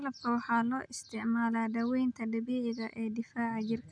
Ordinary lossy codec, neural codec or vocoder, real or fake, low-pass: none; none; real; 9.9 kHz